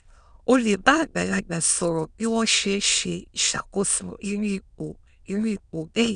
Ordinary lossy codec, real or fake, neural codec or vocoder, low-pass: none; fake; autoencoder, 22.05 kHz, a latent of 192 numbers a frame, VITS, trained on many speakers; 9.9 kHz